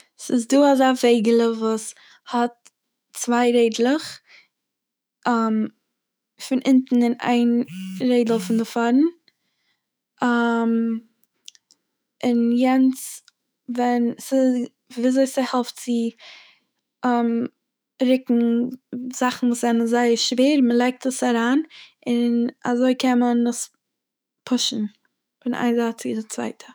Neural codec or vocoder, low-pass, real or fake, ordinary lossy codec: autoencoder, 48 kHz, 128 numbers a frame, DAC-VAE, trained on Japanese speech; none; fake; none